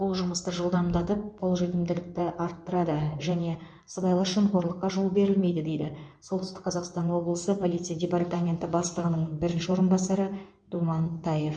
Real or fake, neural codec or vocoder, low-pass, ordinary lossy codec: fake; codec, 16 kHz in and 24 kHz out, 2.2 kbps, FireRedTTS-2 codec; 9.9 kHz; MP3, 64 kbps